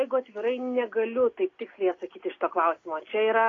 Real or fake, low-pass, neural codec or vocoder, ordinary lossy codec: real; 7.2 kHz; none; AAC, 32 kbps